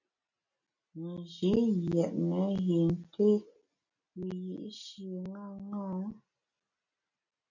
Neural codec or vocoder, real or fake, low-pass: none; real; 7.2 kHz